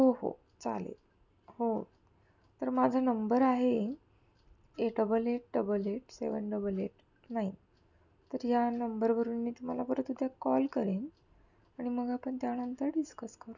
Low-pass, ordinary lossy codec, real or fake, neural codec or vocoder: 7.2 kHz; none; real; none